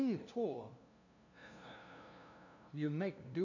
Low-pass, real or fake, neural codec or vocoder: 7.2 kHz; fake; codec, 16 kHz, 0.5 kbps, FunCodec, trained on LibriTTS, 25 frames a second